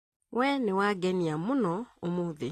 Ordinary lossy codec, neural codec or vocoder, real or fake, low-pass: AAC, 48 kbps; none; real; 14.4 kHz